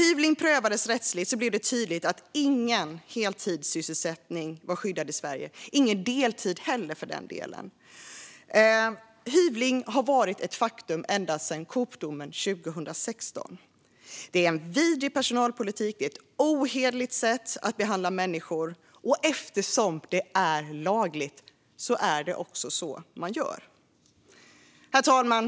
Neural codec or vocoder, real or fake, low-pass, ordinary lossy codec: none; real; none; none